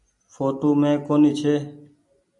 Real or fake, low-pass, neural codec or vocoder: real; 10.8 kHz; none